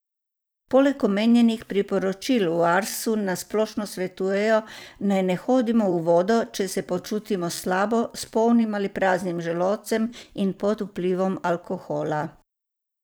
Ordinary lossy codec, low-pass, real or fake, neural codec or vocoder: none; none; fake; vocoder, 44.1 kHz, 128 mel bands every 512 samples, BigVGAN v2